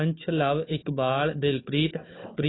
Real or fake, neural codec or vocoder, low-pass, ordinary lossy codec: fake; codec, 16 kHz, 4 kbps, FunCodec, trained on Chinese and English, 50 frames a second; 7.2 kHz; AAC, 16 kbps